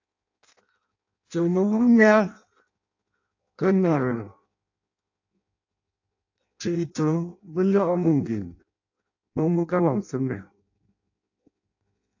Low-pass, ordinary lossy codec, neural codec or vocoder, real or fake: 7.2 kHz; AAC, 48 kbps; codec, 16 kHz in and 24 kHz out, 0.6 kbps, FireRedTTS-2 codec; fake